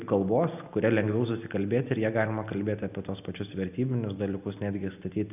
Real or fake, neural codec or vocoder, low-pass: real; none; 3.6 kHz